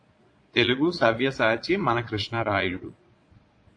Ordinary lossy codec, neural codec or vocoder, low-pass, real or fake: AAC, 48 kbps; vocoder, 22.05 kHz, 80 mel bands, Vocos; 9.9 kHz; fake